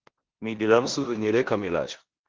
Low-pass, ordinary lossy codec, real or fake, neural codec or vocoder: 7.2 kHz; Opus, 16 kbps; fake; codec, 16 kHz in and 24 kHz out, 0.9 kbps, LongCat-Audio-Codec, four codebook decoder